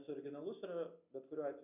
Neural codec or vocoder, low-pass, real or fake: none; 3.6 kHz; real